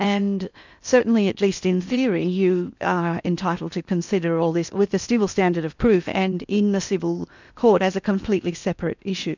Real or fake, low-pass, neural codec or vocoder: fake; 7.2 kHz; codec, 16 kHz in and 24 kHz out, 0.8 kbps, FocalCodec, streaming, 65536 codes